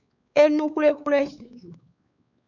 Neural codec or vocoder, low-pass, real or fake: codec, 16 kHz, 4 kbps, X-Codec, WavLM features, trained on Multilingual LibriSpeech; 7.2 kHz; fake